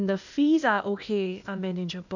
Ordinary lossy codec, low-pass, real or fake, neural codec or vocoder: none; 7.2 kHz; fake; codec, 16 kHz, 0.8 kbps, ZipCodec